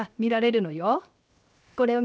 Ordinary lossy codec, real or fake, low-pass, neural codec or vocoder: none; fake; none; codec, 16 kHz, 0.7 kbps, FocalCodec